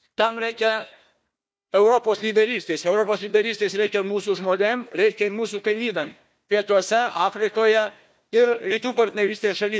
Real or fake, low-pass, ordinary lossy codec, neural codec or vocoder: fake; none; none; codec, 16 kHz, 1 kbps, FunCodec, trained on Chinese and English, 50 frames a second